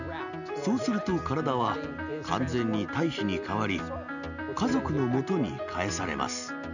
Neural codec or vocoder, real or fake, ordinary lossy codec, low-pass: none; real; none; 7.2 kHz